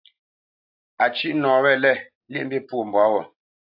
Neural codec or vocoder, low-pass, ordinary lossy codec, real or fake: none; 5.4 kHz; AAC, 48 kbps; real